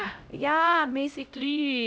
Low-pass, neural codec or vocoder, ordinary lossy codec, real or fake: none; codec, 16 kHz, 0.5 kbps, X-Codec, HuBERT features, trained on LibriSpeech; none; fake